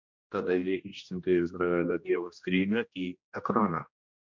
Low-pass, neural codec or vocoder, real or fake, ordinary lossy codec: 7.2 kHz; codec, 16 kHz, 1 kbps, X-Codec, HuBERT features, trained on general audio; fake; MP3, 48 kbps